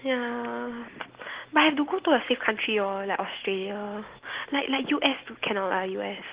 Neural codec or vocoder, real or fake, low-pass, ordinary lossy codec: none; real; 3.6 kHz; Opus, 16 kbps